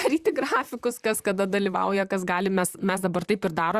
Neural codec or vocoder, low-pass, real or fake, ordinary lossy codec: vocoder, 44.1 kHz, 128 mel bands, Pupu-Vocoder; 14.4 kHz; fake; Opus, 64 kbps